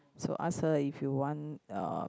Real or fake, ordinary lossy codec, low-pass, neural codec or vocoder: real; none; none; none